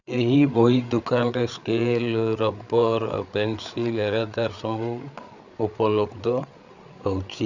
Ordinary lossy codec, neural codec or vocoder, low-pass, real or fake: none; codec, 16 kHz, 8 kbps, FreqCodec, larger model; 7.2 kHz; fake